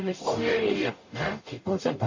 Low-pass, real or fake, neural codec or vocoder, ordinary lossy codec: 7.2 kHz; fake; codec, 44.1 kHz, 0.9 kbps, DAC; MP3, 32 kbps